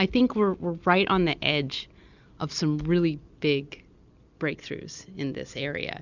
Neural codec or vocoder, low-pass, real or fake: none; 7.2 kHz; real